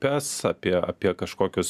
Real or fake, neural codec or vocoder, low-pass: real; none; 14.4 kHz